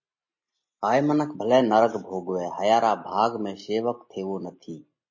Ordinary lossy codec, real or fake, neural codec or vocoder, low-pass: MP3, 32 kbps; real; none; 7.2 kHz